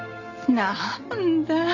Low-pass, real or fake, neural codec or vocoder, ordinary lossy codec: 7.2 kHz; real; none; none